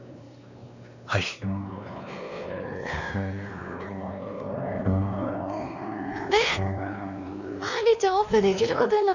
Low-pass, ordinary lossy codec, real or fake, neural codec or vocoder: 7.2 kHz; none; fake; codec, 16 kHz, 2 kbps, X-Codec, WavLM features, trained on Multilingual LibriSpeech